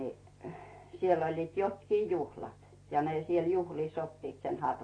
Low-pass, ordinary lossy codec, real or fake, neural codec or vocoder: 9.9 kHz; AAC, 32 kbps; real; none